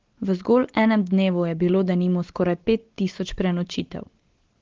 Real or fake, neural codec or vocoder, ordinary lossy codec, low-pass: real; none; Opus, 16 kbps; 7.2 kHz